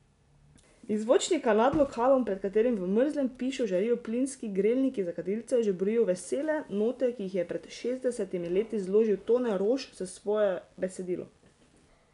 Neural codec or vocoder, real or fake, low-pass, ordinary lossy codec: none; real; 10.8 kHz; none